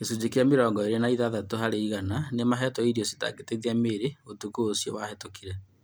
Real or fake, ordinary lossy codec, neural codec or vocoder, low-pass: real; none; none; none